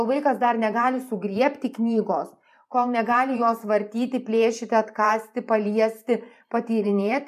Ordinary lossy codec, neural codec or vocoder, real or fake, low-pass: MP3, 96 kbps; vocoder, 44.1 kHz, 128 mel bands every 512 samples, BigVGAN v2; fake; 14.4 kHz